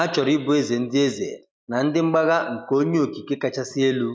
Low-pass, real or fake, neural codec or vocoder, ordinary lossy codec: none; real; none; none